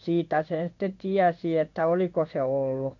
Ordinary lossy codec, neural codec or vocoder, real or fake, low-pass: none; codec, 16 kHz in and 24 kHz out, 1 kbps, XY-Tokenizer; fake; 7.2 kHz